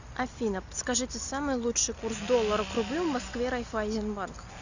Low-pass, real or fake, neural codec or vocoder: 7.2 kHz; real; none